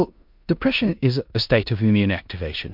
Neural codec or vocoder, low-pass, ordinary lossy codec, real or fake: codec, 16 kHz in and 24 kHz out, 0.9 kbps, LongCat-Audio-Codec, four codebook decoder; 5.4 kHz; MP3, 48 kbps; fake